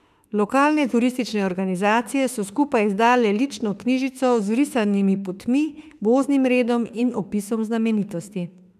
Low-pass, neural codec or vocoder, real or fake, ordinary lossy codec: 14.4 kHz; autoencoder, 48 kHz, 32 numbers a frame, DAC-VAE, trained on Japanese speech; fake; none